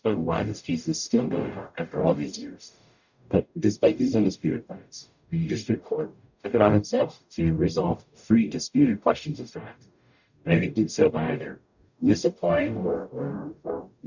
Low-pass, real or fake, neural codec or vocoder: 7.2 kHz; fake; codec, 44.1 kHz, 0.9 kbps, DAC